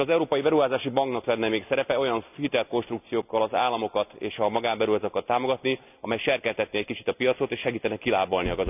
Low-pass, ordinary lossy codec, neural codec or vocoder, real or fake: 3.6 kHz; none; none; real